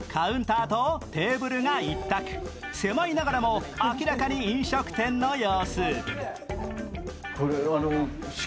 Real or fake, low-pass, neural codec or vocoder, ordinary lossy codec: real; none; none; none